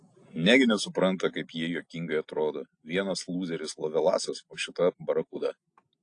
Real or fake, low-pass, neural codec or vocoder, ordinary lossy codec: real; 9.9 kHz; none; AAC, 48 kbps